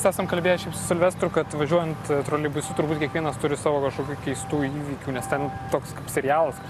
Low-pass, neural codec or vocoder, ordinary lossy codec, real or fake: 14.4 kHz; none; Opus, 64 kbps; real